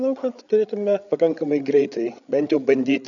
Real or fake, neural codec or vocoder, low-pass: fake; codec, 16 kHz, 8 kbps, FreqCodec, larger model; 7.2 kHz